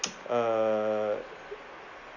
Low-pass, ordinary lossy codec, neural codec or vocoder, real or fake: 7.2 kHz; none; none; real